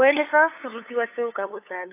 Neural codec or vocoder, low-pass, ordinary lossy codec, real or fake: codec, 16 kHz, 8 kbps, FunCodec, trained on LibriTTS, 25 frames a second; 3.6 kHz; AAC, 24 kbps; fake